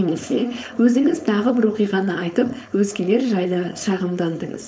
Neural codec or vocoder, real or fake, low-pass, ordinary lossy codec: codec, 16 kHz, 4.8 kbps, FACodec; fake; none; none